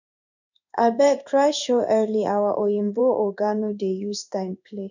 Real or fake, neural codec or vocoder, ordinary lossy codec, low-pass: fake; codec, 16 kHz in and 24 kHz out, 1 kbps, XY-Tokenizer; none; 7.2 kHz